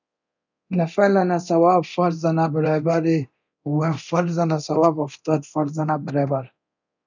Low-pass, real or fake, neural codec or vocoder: 7.2 kHz; fake; codec, 24 kHz, 0.9 kbps, DualCodec